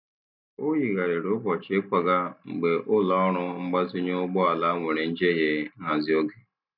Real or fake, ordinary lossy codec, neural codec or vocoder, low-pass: real; none; none; 5.4 kHz